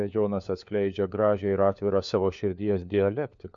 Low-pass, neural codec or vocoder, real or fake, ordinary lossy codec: 7.2 kHz; codec, 16 kHz, 4 kbps, FunCodec, trained on LibriTTS, 50 frames a second; fake; MP3, 64 kbps